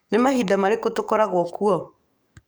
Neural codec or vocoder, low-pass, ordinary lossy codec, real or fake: codec, 44.1 kHz, 7.8 kbps, DAC; none; none; fake